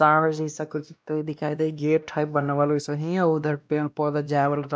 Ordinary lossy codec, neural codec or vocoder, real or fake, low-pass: none; codec, 16 kHz, 1 kbps, X-Codec, WavLM features, trained on Multilingual LibriSpeech; fake; none